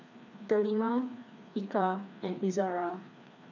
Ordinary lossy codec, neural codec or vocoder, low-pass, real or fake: none; codec, 16 kHz, 2 kbps, FreqCodec, larger model; 7.2 kHz; fake